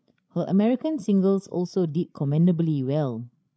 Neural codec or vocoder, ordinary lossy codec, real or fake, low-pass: codec, 16 kHz, 16 kbps, FreqCodec, larger model; none; fake; none